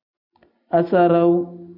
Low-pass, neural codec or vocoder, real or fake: 5.4 kHz; none; real